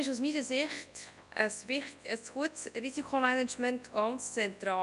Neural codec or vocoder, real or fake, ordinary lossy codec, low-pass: codec, 24 kHz, 0.9 kbps, WavTokenizer, large speech release; fake; none; 10.8 kHz